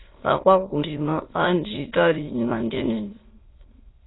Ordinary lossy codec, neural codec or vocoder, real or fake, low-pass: AAC, 16 kbps; autoencoder, 22.05 kHz, a latent of 192 numbers a frame, VITS, trained on many speakers; fake; 7.2 kHz